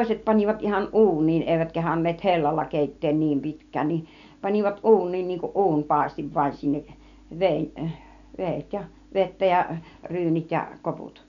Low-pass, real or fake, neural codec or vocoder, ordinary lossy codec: 7.2 kHz; real; none; none